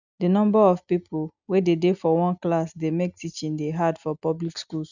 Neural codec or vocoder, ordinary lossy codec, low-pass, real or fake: none; none; 7.2 kHz; real